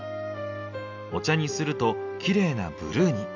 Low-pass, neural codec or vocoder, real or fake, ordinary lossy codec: 7.2 kHz; none; real; AAC, 48 kbps